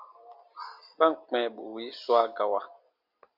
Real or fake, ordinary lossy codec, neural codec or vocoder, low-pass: real; MP3, 48 kbps; none; 5.4 kHz